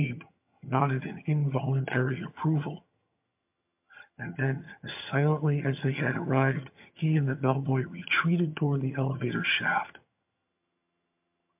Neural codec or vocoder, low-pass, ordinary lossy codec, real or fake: vocoder, 22.05 kHz, 80 mel bands, HiFi-GAN; 3.6 kHz; MP3, 32 kbps; fake